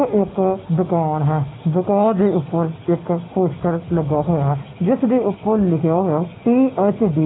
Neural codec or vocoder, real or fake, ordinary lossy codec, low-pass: none; real; AAC, 16 kbps; 7.2 kHz